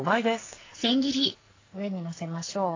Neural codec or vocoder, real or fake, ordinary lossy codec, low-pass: codec, 44.1 kHz, 2.6 kbps, SNAC; fake; AAC, 32 kbps; 7.2 kHz